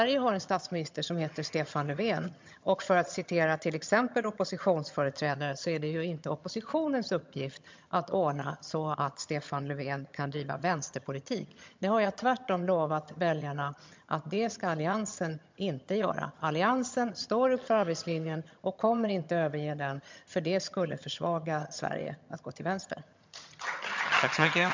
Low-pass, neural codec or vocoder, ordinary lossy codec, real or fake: 7.2 kHz; vocoder, 22.05 kHz, 80 mel bands, HiFi-GAN; MP3, 64 kbps; fake